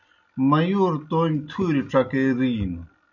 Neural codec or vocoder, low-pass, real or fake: none; 7.2 kHz; real